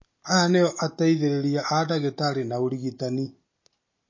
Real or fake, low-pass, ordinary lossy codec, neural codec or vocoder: real; 7.2 kHz; MP3, 32 kbps; none